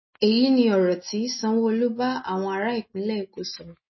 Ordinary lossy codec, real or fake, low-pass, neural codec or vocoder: MP3, 24 kbps; real; 7.2 kHz; none